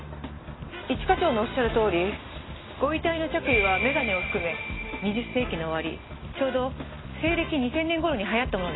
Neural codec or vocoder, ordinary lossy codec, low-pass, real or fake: none; AAC, 16 kbps; 7.2 kHz; real